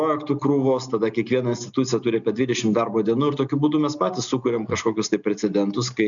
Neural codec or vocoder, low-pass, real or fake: none; 7.2 kHz; real